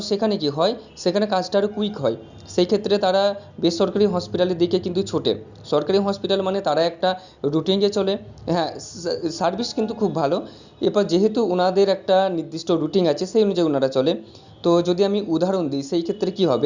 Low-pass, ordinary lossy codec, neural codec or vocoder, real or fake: 7.2 kHz; Opus, 64 kbps; none; real